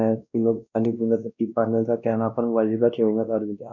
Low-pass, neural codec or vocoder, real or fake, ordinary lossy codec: 7.2 kHz; codec, 16 kHz, 1 kbps, X-Codec, WavLM features, trained on Multilingual LibriSpeech; fake; none